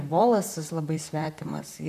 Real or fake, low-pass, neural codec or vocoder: fake; 14.4 kHz; vocoder, 44.1 kHz, 128 mel bands, Pupu-Vocoder